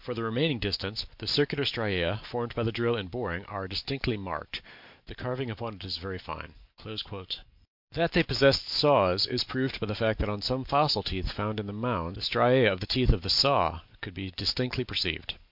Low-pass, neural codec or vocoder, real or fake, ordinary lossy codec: 5.4 kHz; none; real; MP3, 48 kbps